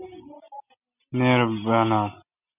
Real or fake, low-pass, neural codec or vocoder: real; 3.6 kHz; none